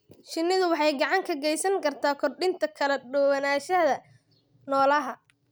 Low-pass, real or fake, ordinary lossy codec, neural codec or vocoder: none; real; none; none